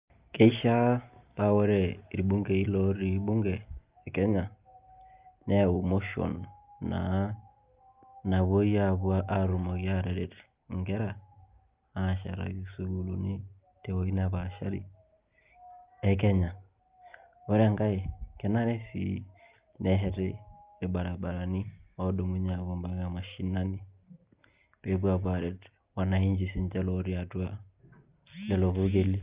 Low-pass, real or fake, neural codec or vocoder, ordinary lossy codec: 3.6 kHz; real; none; Opus, 24 kbps